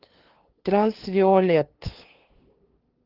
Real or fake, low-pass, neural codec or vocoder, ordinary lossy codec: fake; 5.4 kHz; codec, 16 kHz, 2 kbps, FunCodec, trained on LibriTTS, 25 frames a second; Opus, 16 kbps